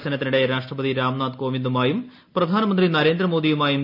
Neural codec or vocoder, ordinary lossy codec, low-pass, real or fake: none; none; 5.4 kHz; real